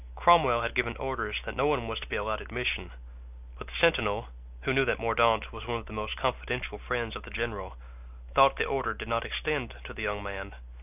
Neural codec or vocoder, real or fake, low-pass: none; real; 3.6 kHz